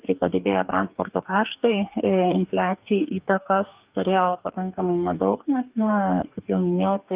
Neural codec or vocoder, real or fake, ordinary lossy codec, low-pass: codec, 44.1 kHz, 2.6 kbps, DAC; fake; Opus, 24 kbps; 3.6 kHz